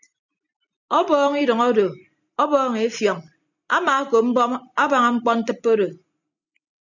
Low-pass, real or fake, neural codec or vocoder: 7.2 kHz; real; none